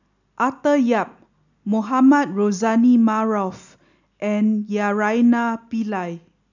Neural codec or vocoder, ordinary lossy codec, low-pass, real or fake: none; none; 7.2 kHz; real